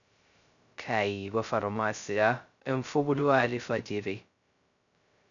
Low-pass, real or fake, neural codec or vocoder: 7.2 kHz; fake; codec, 16 kHz, 0.2 kbps, FocalCodec